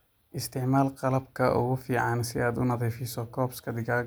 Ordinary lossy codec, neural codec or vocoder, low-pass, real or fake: none; none; none; real